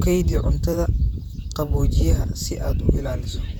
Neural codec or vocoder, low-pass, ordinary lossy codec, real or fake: vocoder, 44.1 kHz, 128 mel bands, Pupu-Vocoder; 19.8 kHz; none; fake